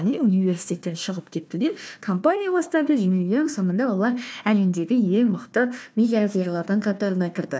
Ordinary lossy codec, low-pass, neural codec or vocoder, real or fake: none; none; codec, 16 kHz, 1 kbps, FunCodec, trained on Chinese and English, 50 frames a second; fake